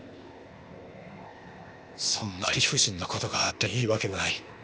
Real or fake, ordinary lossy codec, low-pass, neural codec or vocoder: fake; none; none; codec, 16 kHz, 0.8 kbps, ZipCodec